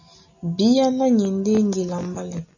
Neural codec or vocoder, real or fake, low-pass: none; real; 7.2 kHz